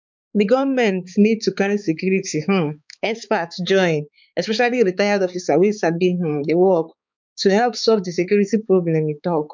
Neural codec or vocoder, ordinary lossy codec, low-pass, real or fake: codec, 16 kHz, 4 kbps, X-Codec, HuBERT features, trained on balanced general audio; MP3, 64 kbps; 7.2 kHz; fake